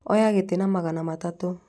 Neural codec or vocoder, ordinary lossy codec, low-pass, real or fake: none; none; none; real